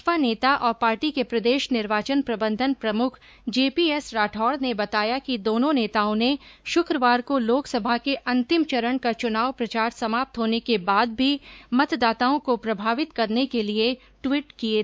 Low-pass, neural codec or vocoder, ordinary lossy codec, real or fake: none; codec, 16 kHz, 4 kbps, X-Codec, WavLM features, trained on Multilingual LibriSpeech; none; fake